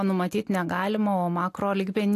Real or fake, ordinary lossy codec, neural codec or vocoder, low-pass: real; AAC, 64 kbps; none; 14.4 kHz